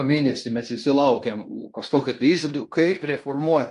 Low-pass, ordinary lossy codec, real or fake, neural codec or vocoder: 10.8 kHz; AAC, 64 kbps; fake; codec, 16 kHz in and 24 kHz out, 0.9 kbps, LongCat-Audio-Codec, fine tuned four codebook decoder